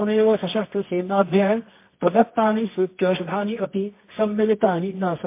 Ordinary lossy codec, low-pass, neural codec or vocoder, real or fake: MP3, 24 kbps; 3.6 kHz; codec, 24 kHz, 0.9 kbps, WavTokenizer, medium music audio release; fake